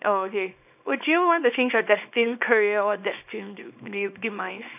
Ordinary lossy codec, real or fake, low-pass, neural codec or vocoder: none; fake; 3.6 kHz; codec, 24 kHz, 0.9 kbps, WavTokenizer, small release